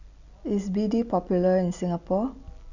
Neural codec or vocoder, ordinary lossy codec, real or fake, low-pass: none; none; real; 7.2 kHz